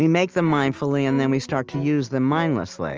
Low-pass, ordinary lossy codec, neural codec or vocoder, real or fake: 7.2 kHz; Opus, 24 kbps; none; real